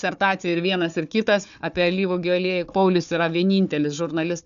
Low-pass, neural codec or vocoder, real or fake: 7.2 kHz; codec, 16 kHz, 4 kbps, FunCodec, trained on Chinese and English, 50 frames a second; fake